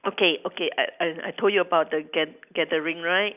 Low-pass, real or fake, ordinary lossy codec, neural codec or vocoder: 3.6 kHz; real; none; none